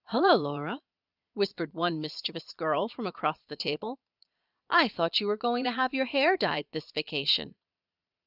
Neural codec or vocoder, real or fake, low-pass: vocoder, 22.05 kHz, 80 mel bands, Vocos; fake; 5.4 kHz